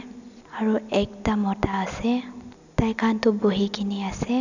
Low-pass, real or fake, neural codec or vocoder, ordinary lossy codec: 7.2 kHz; real; none; none